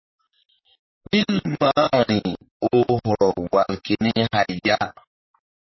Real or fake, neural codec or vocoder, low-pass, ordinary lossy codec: fake; vocoder, 44.1 kHz, 128 mel bands, Pupu-Vocoder; 7.2 kHz; MP3, 24 kbps